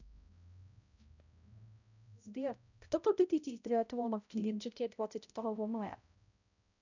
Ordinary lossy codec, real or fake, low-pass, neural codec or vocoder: none; fake; 7.2 kHz; codec, 16 kHz, 0.5 kbps, X-Codec, HuBERT features, trained on balanced general audio